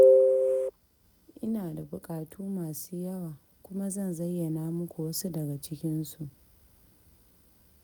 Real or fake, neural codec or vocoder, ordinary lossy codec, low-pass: real; none; none; none